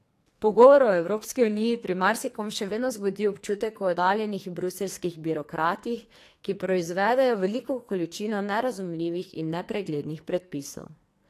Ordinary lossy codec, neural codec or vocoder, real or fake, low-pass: AAC, 64 kbps; codec, 44.1 kHz, 2.6 kbps, SNAC; fake; 14.4 kHz